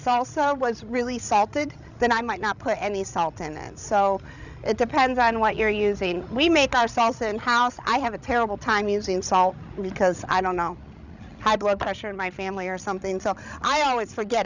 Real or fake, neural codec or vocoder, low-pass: fake; codec, 16 kHz, 16 kbps, FreqCodec, larger model; 7.2 kHz